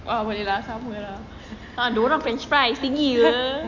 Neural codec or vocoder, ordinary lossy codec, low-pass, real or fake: none; none; 7.2 kHz; real